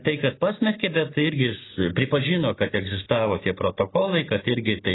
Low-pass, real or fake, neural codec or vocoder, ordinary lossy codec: 7.2 kHz; real; none; AAC, 16 kbps